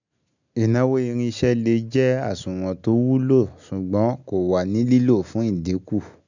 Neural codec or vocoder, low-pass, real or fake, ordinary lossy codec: none; 7.2 kHz; real; none